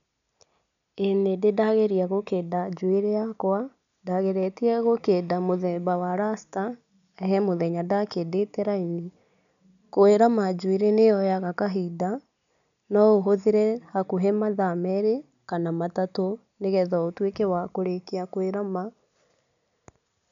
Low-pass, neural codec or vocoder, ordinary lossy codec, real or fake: 7.2 kHz; none; none; real